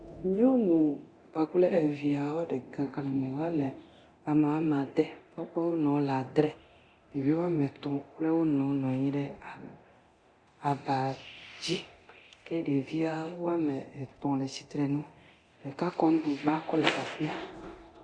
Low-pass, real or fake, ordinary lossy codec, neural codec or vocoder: 9.9 kHz; fake; Opus, 64 kbps; codec, 24 kHz, 0.9 kbps, DualCodec